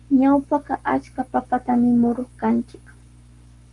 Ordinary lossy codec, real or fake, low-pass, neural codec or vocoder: Opus, 32 kbps; fake; 10.8 kHz; codec, 44.1 kHz, 7.8 kbps, Pupu-Codec